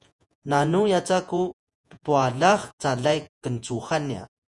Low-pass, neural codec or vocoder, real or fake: 10.8 kHz; vocoder, 48 kHz, 128 mel bands, Vocos; fake